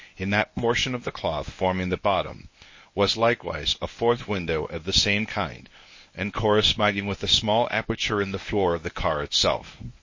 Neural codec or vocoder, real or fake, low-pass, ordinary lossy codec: codec, 24 kHz, 0.9 kbps, WavTokenizer, medium speech release version 1; fake; 7.2 kHz; MP3, 32 kbps